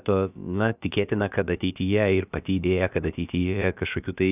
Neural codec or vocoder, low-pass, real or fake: codec, 16 kHz, about 1 kbps, DyCAST, with the encoder's durations; 3.6 kHz; fake